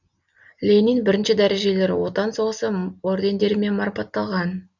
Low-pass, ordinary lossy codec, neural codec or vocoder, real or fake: 7.2 kHz; none; none; real